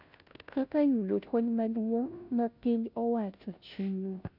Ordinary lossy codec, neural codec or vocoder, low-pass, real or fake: none; codec, 16 kHz, 0.5 kbps, FunCodec, trained on Chinese and English, 25 frames a second; 5.4 kHz; fake